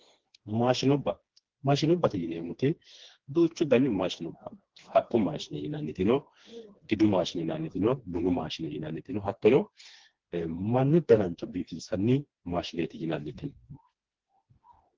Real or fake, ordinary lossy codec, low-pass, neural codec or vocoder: fake; Opus, 16 kbps; 7.2 kHz; codec, 16 kHz, 2 kbps, FreqCodec, smaller model